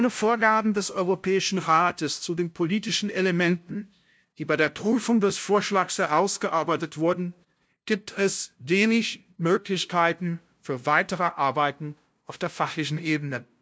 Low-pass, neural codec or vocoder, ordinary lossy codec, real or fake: none; codec, 16 kHz, 0.5 kbps, FunCodec, trained on LibriTTS, 25 frames a second; none; fake